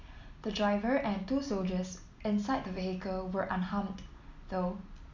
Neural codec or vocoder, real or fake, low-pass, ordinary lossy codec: none; real; 7.2 kHz; none